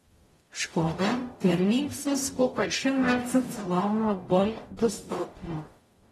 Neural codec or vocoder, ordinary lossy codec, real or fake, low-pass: codec, 44.1 kHz, 0.9 kbps, DAC; AAC, 32 kbps; fake; 19.8 kHz